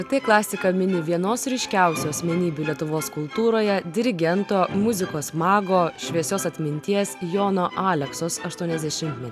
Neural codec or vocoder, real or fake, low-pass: none; real; 14.4 kHz